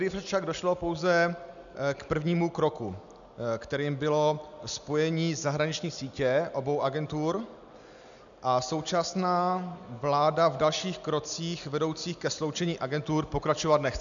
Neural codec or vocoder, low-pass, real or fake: none; 7.2 kHz; real